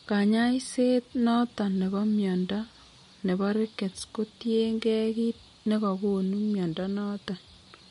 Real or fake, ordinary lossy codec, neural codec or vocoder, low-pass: real; MP3, 48 kbps; none; 10.8 kHz